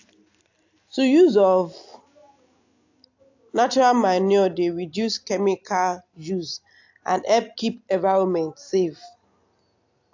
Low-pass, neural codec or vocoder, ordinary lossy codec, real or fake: 7.2 kHz; none; AAC, 48 kbps; real